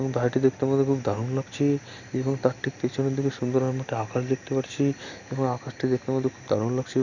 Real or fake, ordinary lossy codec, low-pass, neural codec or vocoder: real; Opus, 64 kbps; 7.2 kHz; none